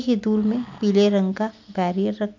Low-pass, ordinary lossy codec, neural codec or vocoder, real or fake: 7.2 kHz; MP3, 64 kbps; none; real